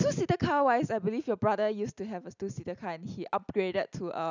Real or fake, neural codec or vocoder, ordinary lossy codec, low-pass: real; none; none; 7.2 kHz